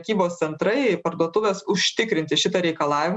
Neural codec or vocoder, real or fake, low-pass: none; real; 9.9 kHz